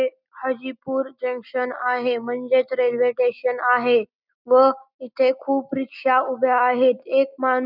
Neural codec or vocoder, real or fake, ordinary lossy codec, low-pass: none; real; none; 5.4 kHz